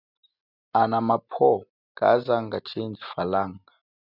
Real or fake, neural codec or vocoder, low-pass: real; none; 5.4 kHz